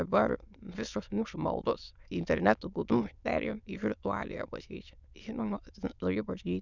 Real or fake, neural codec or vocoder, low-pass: fake; autoencoder, 22.05 kHz, a latent of 192 numbers a frame, VITS, trained on many speakers; 7.2 kHz